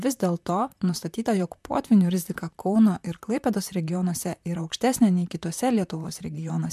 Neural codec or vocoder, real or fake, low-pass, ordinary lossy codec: vocoder, 44.1 kHz, 128 mel bands, Pupu-Vocoder; fake; 14.4 kHz; MP3, 96 kbps